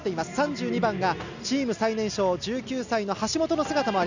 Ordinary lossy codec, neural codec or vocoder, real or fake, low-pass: none; none; real; 7.2 kHz